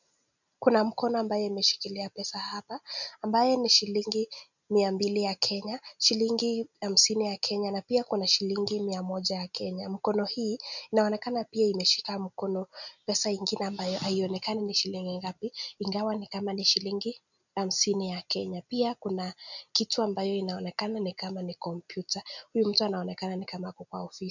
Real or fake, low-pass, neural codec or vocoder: real; 7.2 kHz; none